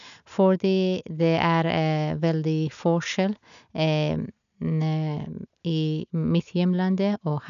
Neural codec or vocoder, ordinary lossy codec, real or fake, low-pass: none; none; real; 7.2 kHz